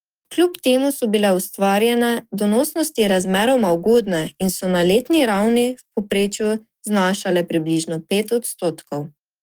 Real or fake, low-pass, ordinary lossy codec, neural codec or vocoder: fake; 19.8 kHz; Opus, 32 kbps; codec, 44.1 kHz, 7.8 kbps, DAC